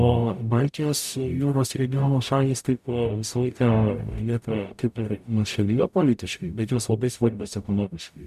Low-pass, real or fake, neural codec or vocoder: 14.4 kHz; fake; codec, 44.1 kHz, 0.9 kbps, DAC